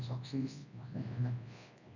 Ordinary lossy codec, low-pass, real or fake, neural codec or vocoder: none; 7.2 kHz; fake; codec, 24 kHz, 0.9 kbps, WavTokenizer, large speech release